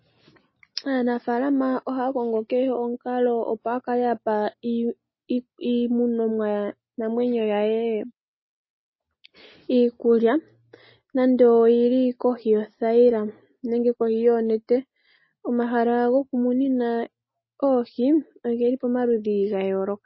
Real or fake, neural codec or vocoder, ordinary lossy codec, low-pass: real; none; MP3, 24 kbps; 7.2 kHz